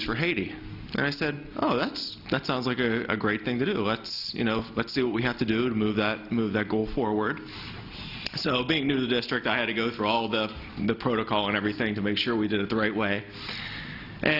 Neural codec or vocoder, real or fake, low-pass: none; real; 5.4 kHz